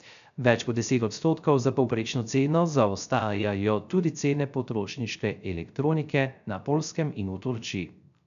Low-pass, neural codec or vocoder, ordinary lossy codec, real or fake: 7.2 kHz; codec, 16 kHz, 0.3 kbps, FocalCodec; none; fake